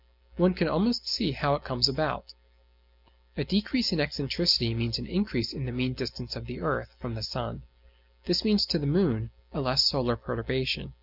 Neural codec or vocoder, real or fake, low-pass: none; real; 5.4 kHz